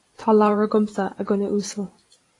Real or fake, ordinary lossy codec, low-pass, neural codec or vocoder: fake; AAC, 32 kbps; 10.8 kHz; vocoder, 44.1 kHz, 128 mel bands every 512 samples, BigVGAN v2